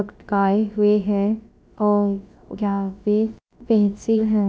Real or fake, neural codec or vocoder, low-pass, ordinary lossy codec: fake; codec, 16 kHz, about 1 kbps, DyCAST, with the encoder's durations; none; none